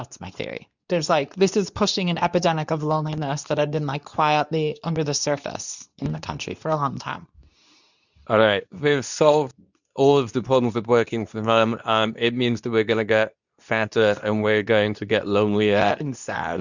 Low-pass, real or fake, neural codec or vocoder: 7.2 kHz; fake; codec, 24 kHz, 0.9 kbps, WavTokenizer, medium speech release version 2